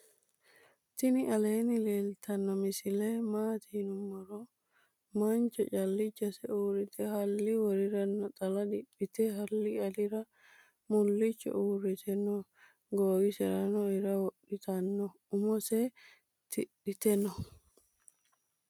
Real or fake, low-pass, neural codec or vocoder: real; 19.8 kHz; none